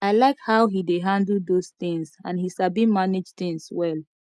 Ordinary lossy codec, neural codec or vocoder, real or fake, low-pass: none; none; real; none